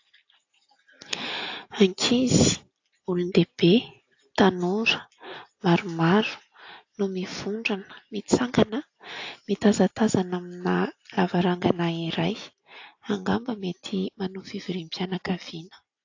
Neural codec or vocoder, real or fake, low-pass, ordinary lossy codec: none; real; 7.2 kHz; AAC, 48 kbps